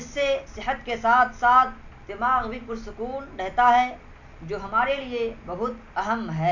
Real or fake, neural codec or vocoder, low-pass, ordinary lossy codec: real; none; 7.2 kHz; none